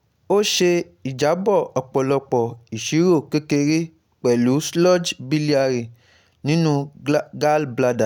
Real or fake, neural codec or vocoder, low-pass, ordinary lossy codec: real; none; 19.8 kHz; none